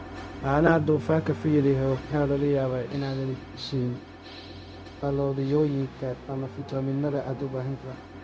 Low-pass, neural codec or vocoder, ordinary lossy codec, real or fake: none; codec, 16 kHz, 0.4 kbps, LongCat-Audio-Codec; none; fake